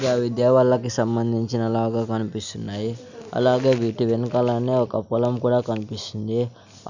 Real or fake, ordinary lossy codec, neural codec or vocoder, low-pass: real; none; none; 7.2 kHz